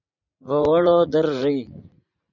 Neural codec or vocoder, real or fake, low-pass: vocoder, 44.1 kHz, 80 mel bands, Vocos; fake; 7.2 kHz